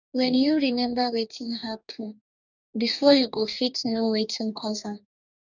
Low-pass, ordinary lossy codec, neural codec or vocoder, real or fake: 7.2 kHz; none; codec, 44.1 kHz, 2.6 kbps, DAC; fake